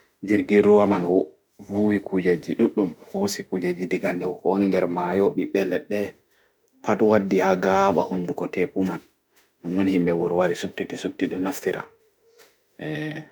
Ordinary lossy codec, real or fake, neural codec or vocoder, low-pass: none; fake; autoencoder, 48 kHz, 32 numbers a frame, DAC-VAE, trained on Japanese speech; none